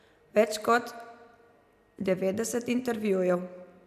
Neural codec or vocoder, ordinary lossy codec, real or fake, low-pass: none; none; real; 14.4 kHz